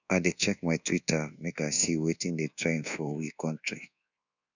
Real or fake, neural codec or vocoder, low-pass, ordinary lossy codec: fake; codec, 24 kHz, 1.2 kbps, DualCodec; 7.2 kHz; none